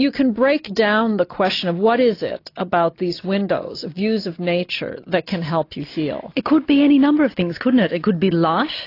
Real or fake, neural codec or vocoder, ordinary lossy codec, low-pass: real; none; AAC, 32 kbps; 5.4 kHz